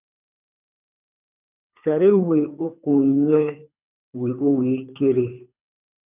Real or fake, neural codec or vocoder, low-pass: fake; codec, 24 kHz, 3 kbps, HILCodec; 3.6 kHz